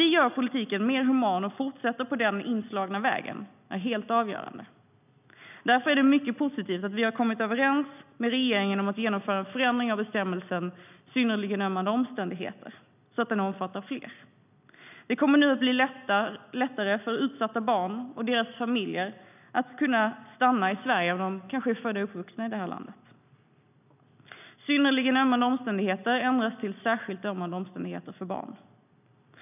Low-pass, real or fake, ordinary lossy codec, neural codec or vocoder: 3.6 kHz; real; none; none